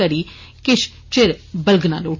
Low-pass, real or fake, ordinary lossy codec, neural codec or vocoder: 7.2 kHz; real; MP3, 32 kbps; none